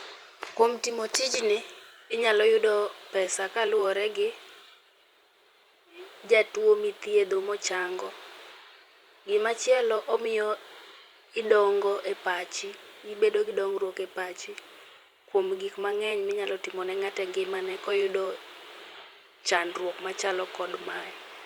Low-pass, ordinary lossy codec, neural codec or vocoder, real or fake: 19.8 kHz; Opus, 64 kbps; vocoder, 44.1 kHz, 128 mel bands every 512 samples, BigVGAN v2; fake